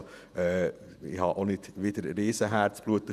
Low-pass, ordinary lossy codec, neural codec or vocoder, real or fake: 14.4 kHz; none; vocoder, 48 kHz, 128 mel bands, Vocos; fake